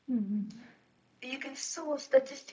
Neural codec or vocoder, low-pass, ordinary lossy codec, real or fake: codec, 16 kHz, 0.4 kbps, LongCat-Audio-Codec; none; none; fake